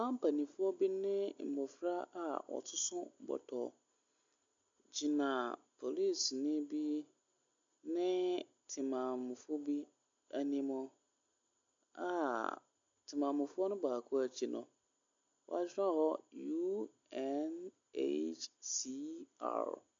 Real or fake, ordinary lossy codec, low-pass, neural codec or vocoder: real; MP3, 48 kbps; 7.2 kHz; none